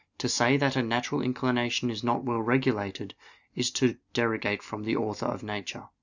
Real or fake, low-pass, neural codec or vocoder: real; 7.2 kHz; none